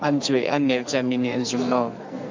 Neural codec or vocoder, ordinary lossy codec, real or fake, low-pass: codec, 44.1 kHz, 2.6 kbps, SNAC; MP3, 64 kbps; fake; 7.2 kHz